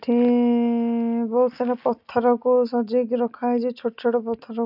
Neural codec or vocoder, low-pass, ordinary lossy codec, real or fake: none; 5.4 kHz; none; real